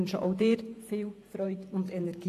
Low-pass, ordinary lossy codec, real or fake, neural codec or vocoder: 14.4 kHz; AAC, 48 kbps; fake; vocoder, 48 kHz, 128 mel bands, Vocos